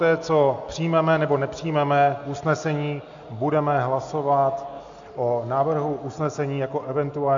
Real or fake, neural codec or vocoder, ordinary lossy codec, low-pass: real; none; AAC, 64 kbps; 7.2 kHz